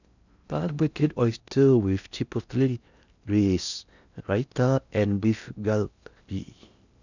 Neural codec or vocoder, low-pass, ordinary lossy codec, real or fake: codec, 16 kHz in and 24 kHz out, 0.6 kbps, FocalCodec, streaming, 4096 codes; 7.2 kHz; none; fake